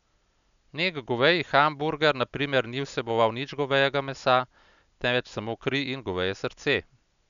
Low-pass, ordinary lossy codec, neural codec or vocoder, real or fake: 7.2 kHz; none; none; real